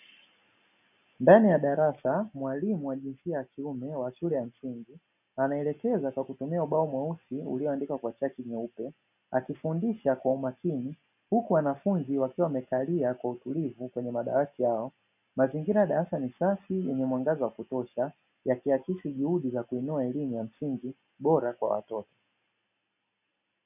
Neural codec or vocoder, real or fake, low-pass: none; real; 3.6 kHz